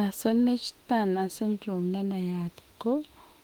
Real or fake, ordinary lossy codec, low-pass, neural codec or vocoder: fake; Opus, 24 kbps; 19.8 kHz; autoencoder, 48 kHz, 32 numbers a frame, DAC-VAE, trained on Japanese speech